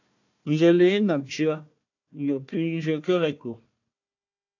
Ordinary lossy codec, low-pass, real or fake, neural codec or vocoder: AAC, 48 kbps; 7.2 kHz; fake; codec, 16 kHz, 1 kbps, FunCodec, trained on Chinese and English, 50 frames a second